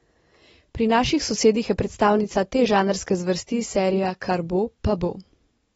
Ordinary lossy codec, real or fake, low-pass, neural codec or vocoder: AAC, 24 kbps; real; 10.8 kHz; none